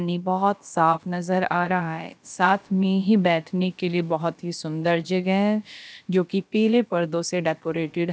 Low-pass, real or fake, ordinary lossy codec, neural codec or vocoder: none; fake; none; codec, 16 kHz, about 1 kbps, DyCAST, with the encoder's durations